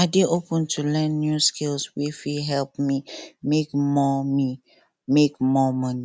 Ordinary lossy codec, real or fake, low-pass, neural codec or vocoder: none; real; none; none